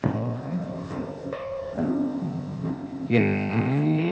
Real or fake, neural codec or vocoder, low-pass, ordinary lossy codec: fake; codec, 16 kHz, 0.8 kbps, ZipCodec; none; none